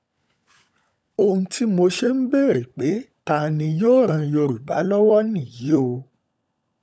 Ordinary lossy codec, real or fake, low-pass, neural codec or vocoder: none; fake; none; codec, 16 kHz, 16 kbps, FunCodec, trained on LibriTTS, 50 frames a second